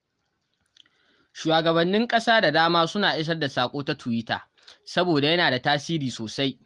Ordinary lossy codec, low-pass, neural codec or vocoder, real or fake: Opus, 24 kbps; 10.8 kHz; none; real